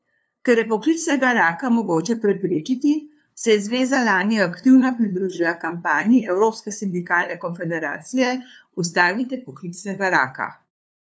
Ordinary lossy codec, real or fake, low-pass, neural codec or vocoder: none; fake; none; codec, 16 kHz, 2 kbps, FunCodec, trained on LibriTTS, 25 frames a second